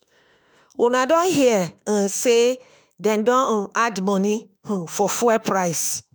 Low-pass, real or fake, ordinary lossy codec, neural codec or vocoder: none; fake; none; autoencoder, 48 kHz, 32 numbers a frame, DAC-VAE, trained on Japanese speech